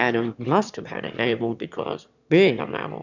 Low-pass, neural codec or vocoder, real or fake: 7.2 kHz; autoencoder, 22.05 kHz, a latent of 192 numbers a frame, VITS, trained on one speaker; fake